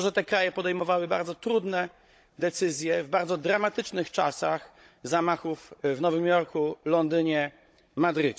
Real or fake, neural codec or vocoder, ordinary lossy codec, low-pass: fake; codec, 16 kHz, 16 kbps, FunCodec, trained on Chinese and English, 50 frames a second; none; none